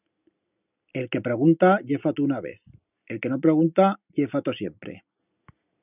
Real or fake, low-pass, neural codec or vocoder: real; 3.6 kHz; none